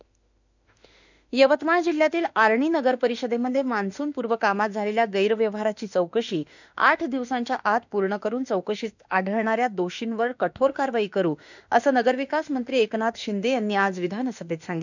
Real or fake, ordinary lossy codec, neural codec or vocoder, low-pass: fake; none; autoencoder, 48 kHz, 32 numbers a frame, DAC-VAE, trained on Japanese speech; 7.2 kHz